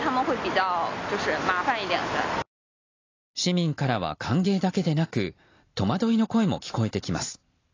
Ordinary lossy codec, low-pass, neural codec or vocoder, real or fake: AAC, 32 kbps; 7.2 kHz; none; real